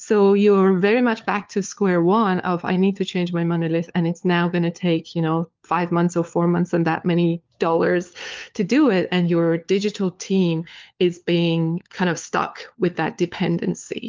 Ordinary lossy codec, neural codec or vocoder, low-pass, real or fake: Opus, 32 kbps; codec, 16 kHz, 4 kbps, FunCodec, trained on LibriTTS, 50 frames a second; 7.2 kHz; fake